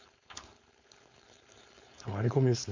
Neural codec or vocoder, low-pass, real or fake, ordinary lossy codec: codec, 16 kHz, 4.8 kbps, FACodec; 7.2 kHz; fake; MP3, 48 kbps